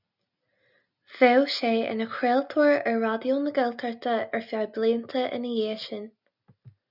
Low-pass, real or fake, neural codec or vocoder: 5.4 kHz; real; none